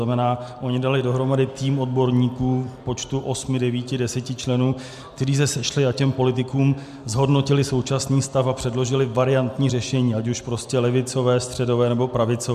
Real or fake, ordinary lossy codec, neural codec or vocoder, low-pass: real; MP3, 96 kbps; none; 14.4 kHz